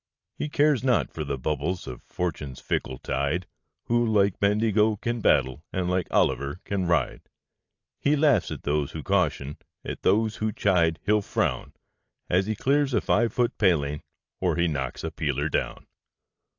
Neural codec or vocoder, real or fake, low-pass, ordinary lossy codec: none; real; 7.2 kHz; AAC, 48 kbps